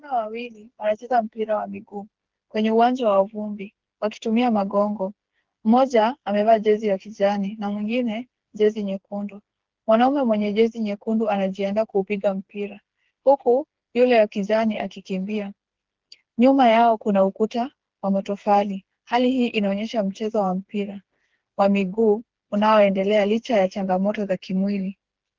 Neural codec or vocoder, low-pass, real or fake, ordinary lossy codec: codec, 16 kHz, 4 kbps, FreqCodec, smaller model; 7.2 kHz; fake; Opus, 16 kbps